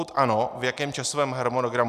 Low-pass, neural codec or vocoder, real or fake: 14.4 kHz; none; real